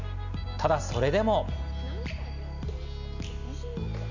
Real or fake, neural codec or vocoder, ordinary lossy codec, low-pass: real; none; none; 7.2 kHz